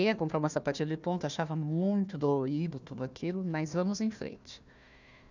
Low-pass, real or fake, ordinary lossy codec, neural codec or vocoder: 7.2 kHz; fake; none; codec, 16 kHz, 1 kbps, FunCodec, trained on Chinese and English, 50 frames a second